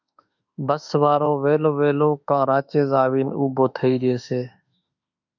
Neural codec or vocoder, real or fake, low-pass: autoencoder, 48 kHz, 32 numbers a frame, DAC-VAE, trained on Japanese speech; fake; 7.2 kHz